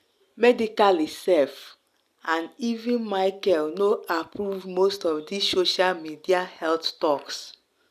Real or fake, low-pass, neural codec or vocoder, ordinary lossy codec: real; 14.4 kHz; none; none